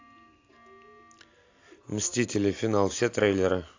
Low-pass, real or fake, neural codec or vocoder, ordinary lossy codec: 7.2 kHz; real; none; AAC, 48 kbps